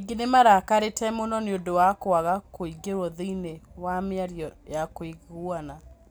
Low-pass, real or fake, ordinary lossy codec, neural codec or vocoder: none; real; none; none